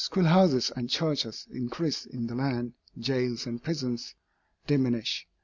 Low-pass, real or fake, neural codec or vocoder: 7.2 kHz; real; none